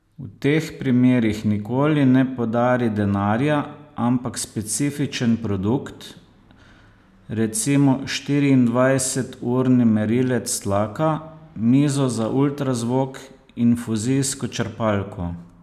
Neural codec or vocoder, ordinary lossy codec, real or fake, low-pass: none; none; real; 14.4 kHz